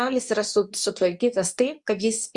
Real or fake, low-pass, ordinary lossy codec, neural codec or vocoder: fake; 10.8 kHz; Opus, 64 kbps; codec, 24 kHz, 0.9 kbps, WavTokenizer, medium speech release version 2